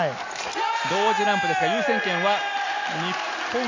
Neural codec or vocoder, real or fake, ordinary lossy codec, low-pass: none; real; AAC, 48 kbps; 7.2 kHz